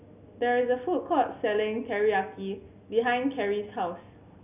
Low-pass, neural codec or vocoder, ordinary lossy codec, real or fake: 3.6 kHz; none; none; real